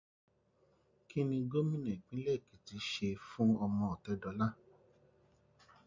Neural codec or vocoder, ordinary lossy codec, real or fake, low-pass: none; MP3, 48 kbps; real; 7.2 kHz